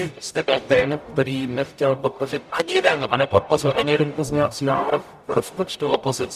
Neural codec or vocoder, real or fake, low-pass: codec, 44.1 kHz, 0.9 kbps, DAC; fake; 14.4 kHz